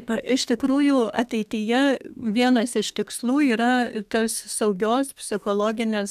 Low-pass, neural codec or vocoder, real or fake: 14.4 kHz; codec, 32 kHz, 1.9 kbps, SNAC; fake